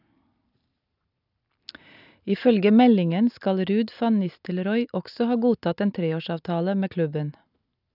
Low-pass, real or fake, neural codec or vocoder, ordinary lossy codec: 5.4 kHz; real; none; none